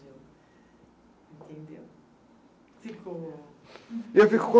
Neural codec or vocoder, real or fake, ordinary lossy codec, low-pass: none; real; none; none